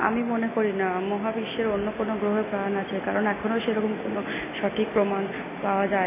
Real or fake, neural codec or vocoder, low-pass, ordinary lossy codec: real; none; 3.6 kHz; MP3, 16 kbps